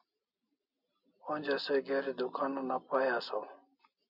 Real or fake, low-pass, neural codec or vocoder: real; 5.4 kHz; none